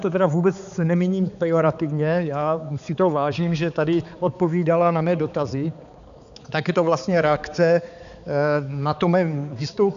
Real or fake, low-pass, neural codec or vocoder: fake; 7.2 kHz; codec, 16 kHz, 4 kbps, X-Codec, HuBERT features, trained on balanced general audio